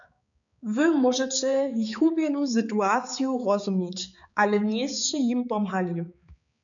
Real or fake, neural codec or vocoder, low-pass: fake; codec, 16 kHz, 4 kbps, X-Codec, HuBERT features, trained on balanced general audio; 7.2 kHz